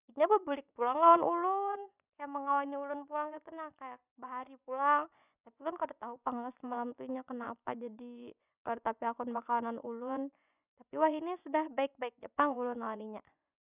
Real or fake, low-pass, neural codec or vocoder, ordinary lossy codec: fake; 3.6 kHz; vocoder, 24 kHz, 100 mel bands, Vocos; none